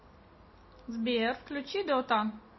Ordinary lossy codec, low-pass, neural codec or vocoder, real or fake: MP3, 24 kbps; 7.2 kHz; none; real